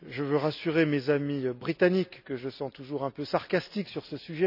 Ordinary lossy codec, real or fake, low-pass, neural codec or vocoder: none; real; 5.4 kHz; none